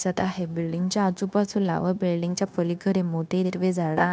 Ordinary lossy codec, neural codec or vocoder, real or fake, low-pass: none; codec, 16 kHz, 0.9 kbps, LongCat-Audio-Codec; fake; none